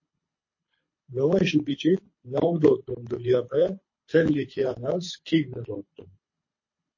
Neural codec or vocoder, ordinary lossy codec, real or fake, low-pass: codec, 24 kHz, 3 kbps, HILCodec; MP3, 32 kbps; fake; 7.2 kHz